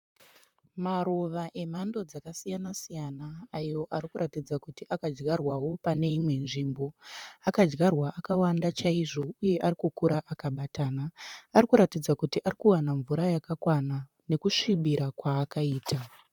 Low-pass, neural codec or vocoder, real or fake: 19.8 kHz; vocoder, 44.1 kHz, 128 mel bands, Pupu-Vocoder; fake